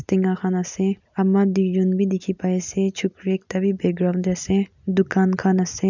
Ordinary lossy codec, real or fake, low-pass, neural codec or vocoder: none; real; 7.2 kHz; none